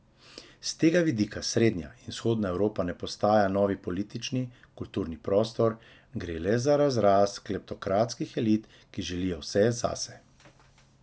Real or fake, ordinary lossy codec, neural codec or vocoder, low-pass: real; none; none; none